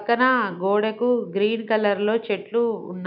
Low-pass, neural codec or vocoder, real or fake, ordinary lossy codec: 5.4 kHz; none; real; none